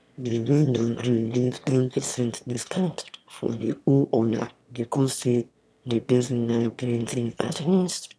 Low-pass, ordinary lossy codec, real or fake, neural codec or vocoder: none; none; fake; autoencoder, 22.05 kHz, a latent of 192 numbers a frame, VITS, trained on one speaker